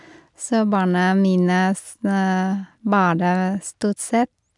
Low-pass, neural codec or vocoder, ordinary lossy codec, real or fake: 10.8 kHz; none; none; real